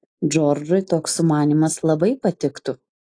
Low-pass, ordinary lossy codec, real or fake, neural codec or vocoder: 9.9 kHz; AAC, 48 kbps; real; none